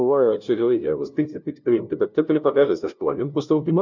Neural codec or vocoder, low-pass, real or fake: codec, 16 kHz, 0.5 kbps, FunCodec, trained on LibriTTS, 25 frames a second; 7.2 kHz; fake